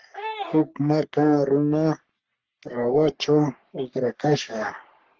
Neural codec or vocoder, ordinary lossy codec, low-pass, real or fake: codec, 44.1 kHz, 3.4 kbps, Pupu-Codec; Opus, 16 kbps; 7.2 kHz; fake